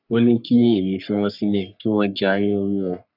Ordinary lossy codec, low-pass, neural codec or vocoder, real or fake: none; 5.4 kHz; codec, 44.1 kHz, 3.4 kbps, Pupu-Codec; fake